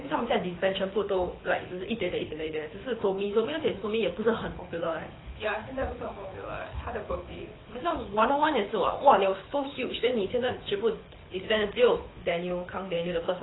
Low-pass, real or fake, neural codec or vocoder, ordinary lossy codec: 7.2 kHz; fake; codec, 24 kHz, 6 kbps, HILCodec; AAC, 16 kbps